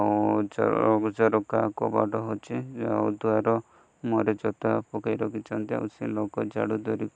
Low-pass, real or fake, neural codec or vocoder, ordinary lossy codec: none; real; none; none